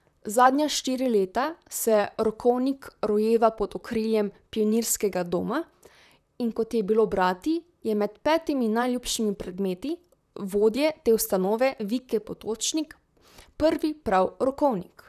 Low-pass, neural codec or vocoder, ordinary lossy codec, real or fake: 14.4 kHz; vocoder, 44.1 kHz, 128 mel bands, Pupu-Vocoder; none; fake